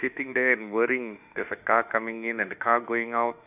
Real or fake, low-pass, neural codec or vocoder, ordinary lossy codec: fake; 3.6 kHz; autoencoder, 48 kHz, 32 numbers a frame, DAC-VAE, trained on Japanese speech; none